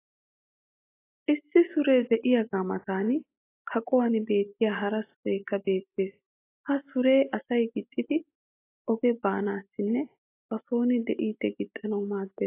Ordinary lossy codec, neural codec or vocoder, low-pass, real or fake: AAC, 24 kbps; none; 3.6 kHz; real